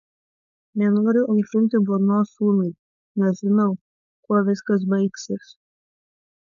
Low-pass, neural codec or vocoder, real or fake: 7.2 kHz; codec, 16 kHz, 4.8 kbps, FACodec; fake